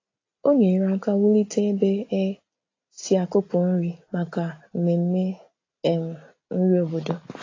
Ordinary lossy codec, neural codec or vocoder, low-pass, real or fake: AAC, 32 kbps; none; 7.2 kHz; real